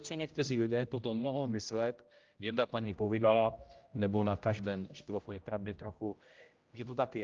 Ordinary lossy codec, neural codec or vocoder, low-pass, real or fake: Opus, 24 kbps; codec, 16 kHz, 0.5 kbps, X-Codec, HuBERT features, trained on general audio; 7.2 kHz; fake